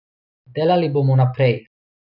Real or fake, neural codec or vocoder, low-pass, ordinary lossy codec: real; none; 5.4 kHz; none